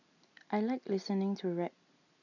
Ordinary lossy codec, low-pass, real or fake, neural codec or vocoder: none; 7.2 kHz; real; none